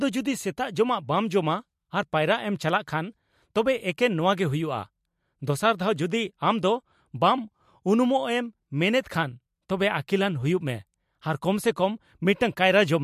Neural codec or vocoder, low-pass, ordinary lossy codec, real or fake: none; 14.4 kHz; MP3, 64 kbps; real